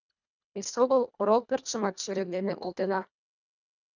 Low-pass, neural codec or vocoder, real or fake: 7.2 kHz; codec, 24 kHz, 1.5 kbps, HILCodec; fake